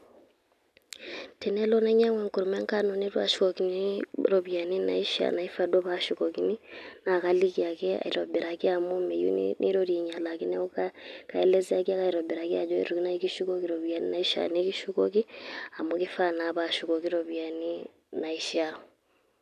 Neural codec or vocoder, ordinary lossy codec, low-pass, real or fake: none; none; 14.4 kHz; real